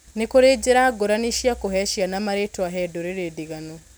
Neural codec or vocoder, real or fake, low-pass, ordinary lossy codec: none; real; none; none